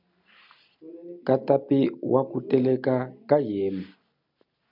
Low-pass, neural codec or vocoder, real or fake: 5.4 kHz; none; real